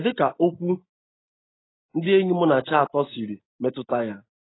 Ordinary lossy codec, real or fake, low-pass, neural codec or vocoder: AAC, 16 kbps; real; 7.2 kHz; none